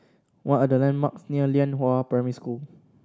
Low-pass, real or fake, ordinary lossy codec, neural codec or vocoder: none; real; none; none